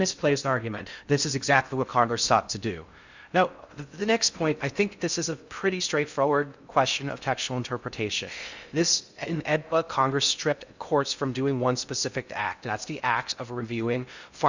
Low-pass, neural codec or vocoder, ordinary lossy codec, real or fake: 7.2 kHz; codec, 16 kHz in and 24 kHz out, 0.6 kbps, FocalCodec, streaming, 2048 codes; Opus, 64 kbps; fake